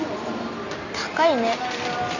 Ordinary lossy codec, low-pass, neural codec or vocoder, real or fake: none; 7.2 kHz; none; real